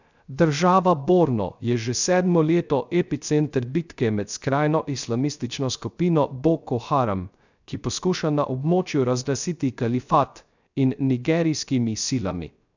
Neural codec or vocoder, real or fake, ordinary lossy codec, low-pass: codec, 16 kHz, 0.3 kbps, FocalCodec; fake; none; 7.2 kHz